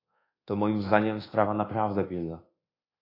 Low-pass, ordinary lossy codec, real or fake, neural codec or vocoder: 5.4 kHz; AAC, 24 kbps; fake; codec, 24 kHz, 1.2 kbps, DualCodec